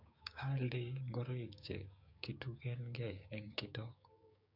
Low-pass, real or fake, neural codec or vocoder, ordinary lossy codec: 5.4 kHz; fake; codec, 16 kHz, 6 kbps, DAC; none